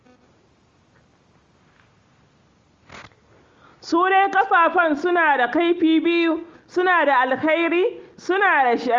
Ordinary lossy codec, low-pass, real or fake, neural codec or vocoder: Opus, 32 kbps; 7.2 kHz; real; none